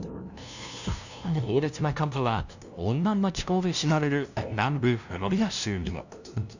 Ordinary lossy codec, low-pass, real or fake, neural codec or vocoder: none; 7.2 kHz; fake; codec, 16 kHz, 0.5 kbps, FunCodec, trained on LibriTTS, 25 frames a second